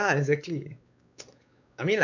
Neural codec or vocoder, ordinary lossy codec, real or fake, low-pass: codec, 16 kHz, 4 kbps, X-Codec, WavLM features, trained on Multilingual LibriSpeech; none; fake; 7.2 kHz